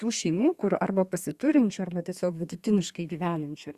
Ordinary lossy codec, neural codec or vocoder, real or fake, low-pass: Opus, 64 kbps; codec, 32 kHz, 1.9 kbps, SNAC; fake; 14.4 kHz